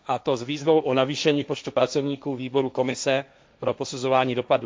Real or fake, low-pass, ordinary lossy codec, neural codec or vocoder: fake; none; none; codec, 16 kHz, 1.1 kbps, Voila-Tokenizer